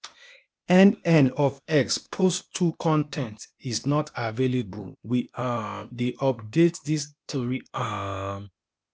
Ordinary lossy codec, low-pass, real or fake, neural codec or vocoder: none; none; fake; codec, 16 kHz, 0.8 kbps, ZipCodec